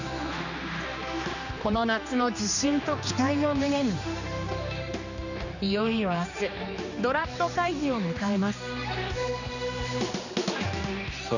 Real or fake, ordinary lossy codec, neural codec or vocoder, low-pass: fake; none; codec, 16 kHz, 2 kbps, X-Codec, HuBERT features, trained on general audio; 7.2 kHz